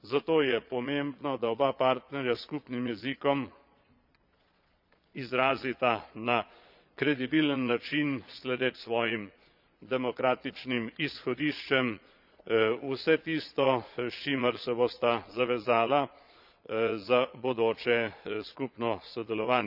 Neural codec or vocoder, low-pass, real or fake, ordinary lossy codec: vocoder, 22.05 kHz, 80 mel bands, Vocos; 5.4 kHz; fake; none